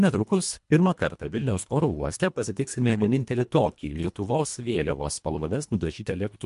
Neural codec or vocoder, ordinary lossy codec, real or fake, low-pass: codec, 24 kHz, 1.5 kbps, HILCodec; MP3, 64 kbps; fake; 10.8 kHz